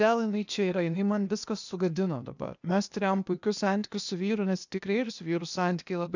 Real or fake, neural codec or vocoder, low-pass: fake; codec, 16 kHz, 0.8 kbps, ZipCodec; 7.2 kHz